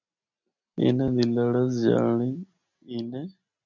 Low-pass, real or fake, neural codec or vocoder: 7.2 kHz; real; none